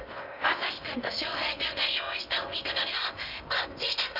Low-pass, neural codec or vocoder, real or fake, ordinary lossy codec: 5.4 kHz; codec, 16 kHz in and 24 kHz out, 0.6 kbps, FocalCodec, streaming, 2048 codes; fake; Opus, 64 kbps